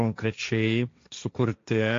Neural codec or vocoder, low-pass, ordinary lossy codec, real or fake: codec, 16 kHz, 1.1 kbps, Voila-Tokenizer; 7.2 kHz; AAC, 48 kbps; fake